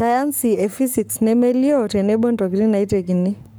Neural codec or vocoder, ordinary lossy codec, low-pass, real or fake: codec, 44.1 kHz, 7.8 kbps, Pupu-Codec; none; none; fake